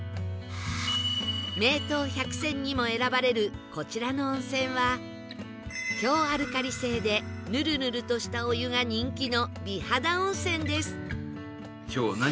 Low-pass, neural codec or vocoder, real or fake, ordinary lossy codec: none; none; real; none